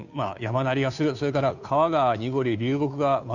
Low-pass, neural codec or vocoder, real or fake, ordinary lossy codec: 7.2 kHz; codec, 16 kHz, 2 kbps, FunCodec, trained on Chinese and English, 25 frames a second; fake; none